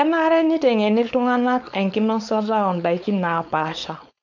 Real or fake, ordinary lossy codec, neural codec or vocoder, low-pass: fake; none; codec, 16 kHz, 4.8 kbps, FACodec; 7.2 kHz